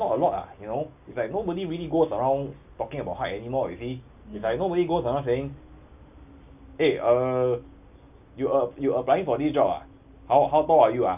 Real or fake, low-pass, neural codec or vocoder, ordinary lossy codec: real; 3.6 kHz; none; none